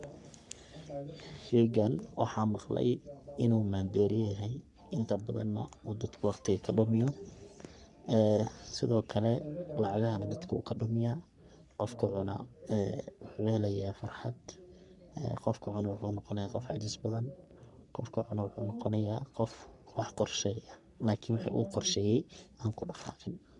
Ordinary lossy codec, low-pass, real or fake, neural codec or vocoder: none; 10.8 kHz; fake; codec, 44.1 kHz, 3.4 kbps, Pupu-Codec